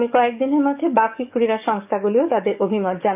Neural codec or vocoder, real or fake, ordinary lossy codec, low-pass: codec, 44.1 kHz, 7.8 kbps, DAC; fake; none; 3.6 kHz